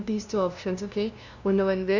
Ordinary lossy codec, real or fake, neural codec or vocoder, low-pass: none; fake; codec, 16 kHz, 0.5 kbps, FunCodec, trained on LibriTTS, 25 frames a second; 7.2 kHz